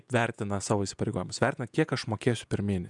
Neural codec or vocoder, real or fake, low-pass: vocoder, 48 kHz, 128 mel bands, Vocos; fake; 10.8 kHz